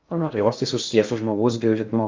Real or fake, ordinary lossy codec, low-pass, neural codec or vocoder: fake; Opus, 32 kbps; 7.2 kHz; codec, 16 kHz in and 24 kHz out, 0.6 kbps, FocalCodec, streaming, 2048 codes